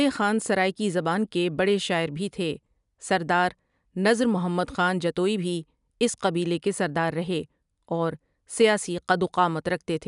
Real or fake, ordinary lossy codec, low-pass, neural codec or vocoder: real; none; 10.8 kHz; none